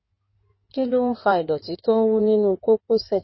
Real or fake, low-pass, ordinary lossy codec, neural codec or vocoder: fake; 7.2 kHz; MP3, 24 kbps; codec, 16 kHz in and 24 kHz out, 2.2 kbps, FireRedTTS-2 codec